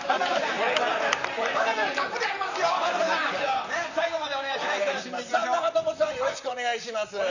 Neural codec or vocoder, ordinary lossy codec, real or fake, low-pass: vocoder, 44.1 kHz, 128 mel bands, Pupu-Vocoder; none; fake; 7.2 kHz